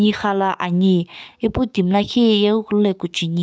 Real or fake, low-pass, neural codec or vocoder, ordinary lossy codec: fake; none; codec, 16 kHz, 6 kbps, DAC; none